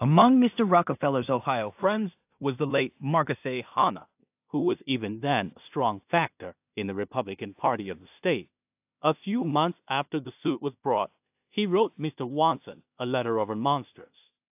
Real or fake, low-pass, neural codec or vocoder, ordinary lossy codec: fake; 3.6 kHz; codec, 16 kHz in and 24 kHz out, 0.4 kbps, LongCat-Audio-Codec, two codebook decoder; AAC, 32 kbps